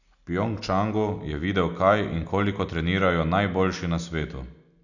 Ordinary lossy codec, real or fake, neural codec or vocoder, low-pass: none; real; none; 7.2 kHz